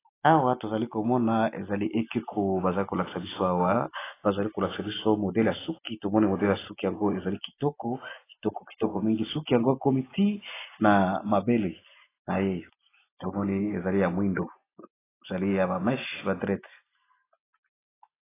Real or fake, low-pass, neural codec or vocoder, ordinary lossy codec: real; 3.6 kHz; none; AAC, 16 kbps